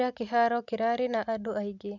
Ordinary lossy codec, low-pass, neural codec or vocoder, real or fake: none; 7.2 kHz; none; real